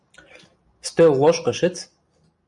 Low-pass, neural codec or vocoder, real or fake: 10.8 kHz; none; real